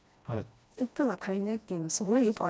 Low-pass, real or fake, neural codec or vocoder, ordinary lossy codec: none; fake; codec, 16 kHz, 1 kbps, FreqCodec, smaller model; none